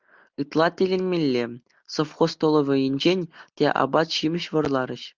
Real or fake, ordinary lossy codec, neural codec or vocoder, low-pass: real; Opus, 32 kbps; none; 7.2 kHz